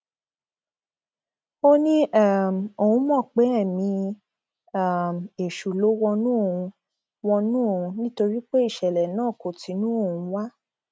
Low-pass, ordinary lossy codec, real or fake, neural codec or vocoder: none; none; real; none